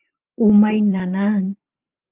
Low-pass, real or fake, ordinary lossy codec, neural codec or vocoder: 3.6 kHz; fake; Opus, 32 kbps; vocoder, 44.1 kHz, 128 mel bands every 512 samples, BigVGAN v2